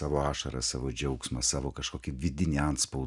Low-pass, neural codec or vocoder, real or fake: 10.8 kHz; none; real